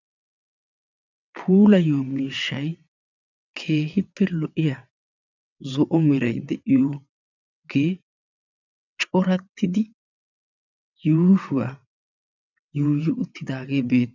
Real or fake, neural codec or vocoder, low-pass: fake; vocoder, 22.05 kHz, 80 mel bands, WaveNeXt; 7.2 kHz